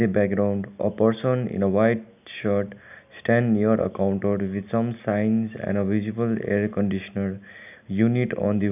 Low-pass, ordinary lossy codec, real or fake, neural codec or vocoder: 3.6 kHz; none; real; none